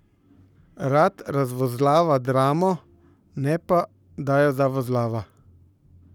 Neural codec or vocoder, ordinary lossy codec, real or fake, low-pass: codec, 44.1 kHz, 7.8 kbps, Pupu-Codec; none; fake; 19.8 kHz